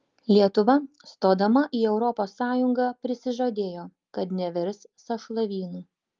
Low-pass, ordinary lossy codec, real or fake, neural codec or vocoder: 7.2 kHz; Opus, 32 kbps; real; none